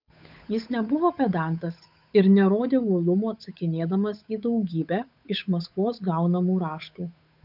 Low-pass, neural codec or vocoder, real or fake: 5.4 kHz; codec, 16 kHz, 8 kbps, FunCodec, trained on Chinese and English, 25 frames a second; fake